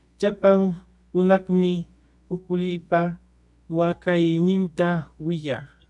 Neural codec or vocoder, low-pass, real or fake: codec, 24 kHz, 0.9 kbps, WavTokenizer, medium music audio release; 10.8 kHz; fake